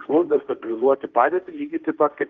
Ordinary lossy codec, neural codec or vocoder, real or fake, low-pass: Opus, 24 kbps; codec, 16 kHz, 1.1 kbps, Voila-Tokenizer; fake; 7.2 kHz